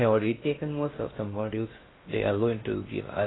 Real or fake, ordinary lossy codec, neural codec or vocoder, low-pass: fake; AAC, 16 kbps; codec, 16 kHz in and 24 kHz out, 0.6 kbps, FocalCodec, streaming, 2048 codes; 7.2 kHz